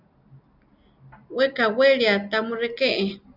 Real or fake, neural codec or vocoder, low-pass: real; none; 5.4 kHz